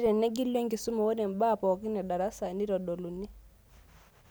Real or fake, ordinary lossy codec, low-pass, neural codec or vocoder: real; none; none; none